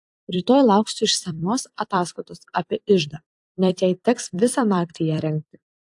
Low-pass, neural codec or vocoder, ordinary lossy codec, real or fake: 10.8 kHz; vocoder, 44.1 kHz, 128 mel bands every 256 samples, BigVGAN v2; AAC, 64 kbps; fake